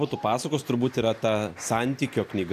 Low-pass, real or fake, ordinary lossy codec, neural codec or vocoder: 14.4 kHz; real; AAC, 64 kbps; none